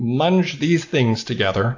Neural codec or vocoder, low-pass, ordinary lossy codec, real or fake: none; 7.2 kHz; AAC, 48 kbps; real